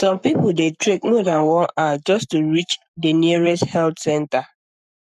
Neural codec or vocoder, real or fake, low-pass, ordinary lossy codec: codec, 44.1 kHz, 7.8 kbps, Pupu-Codec; fake; 14.4 kHz; none